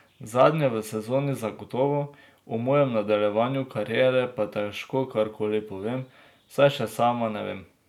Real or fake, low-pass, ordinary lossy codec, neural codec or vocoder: real; 19.8 kHz; none; none